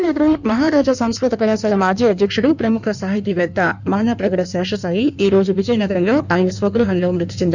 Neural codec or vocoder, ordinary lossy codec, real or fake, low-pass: codec, 16 kHz in and 24 kHz out, 1.1 kbps, FireRedTTS-2 codec; none; fake; 7.2 kHz